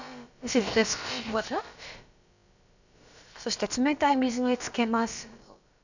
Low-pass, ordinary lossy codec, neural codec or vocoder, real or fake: 7.2 kHz; none; codec, 16 kHz, about 1 kbps, DyCAST, with the encoder's durations; fake